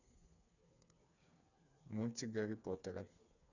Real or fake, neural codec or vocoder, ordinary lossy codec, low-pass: fake; codec, 16 kHz, 4 kbps, FreqCodec, smaller model; none; 7.2 kHz